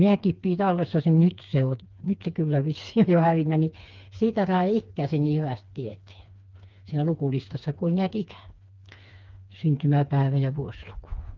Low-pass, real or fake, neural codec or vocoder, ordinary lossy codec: 7.2 kHz; fake; codec, 16 kHz, 4 kbps, FreqCodec, smaller model; Opus, 32 kbps